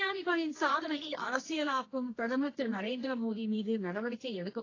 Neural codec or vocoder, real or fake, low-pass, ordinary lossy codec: codec, 24 kHz, 0.9 kbps, WavTokenizer, medium music audio release; fake; 7.2 kHz; AAC, 32 kbps